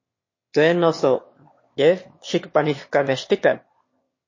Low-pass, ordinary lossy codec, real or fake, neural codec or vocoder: 7.2 kHz; MP3, 32 kbps; fake; autoencoder, 22.05 kHz, a latent of 192 numbers a frame, VITS, trained on one speaker